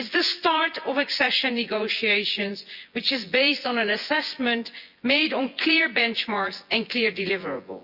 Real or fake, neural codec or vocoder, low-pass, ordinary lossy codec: fake; vocoder, 24 kHz, 100 mel bands, Vocos; 5.4 kHz; Opus, 64 kbps